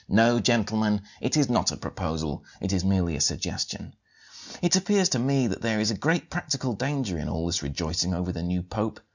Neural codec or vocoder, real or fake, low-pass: none; real; 7.2 kHz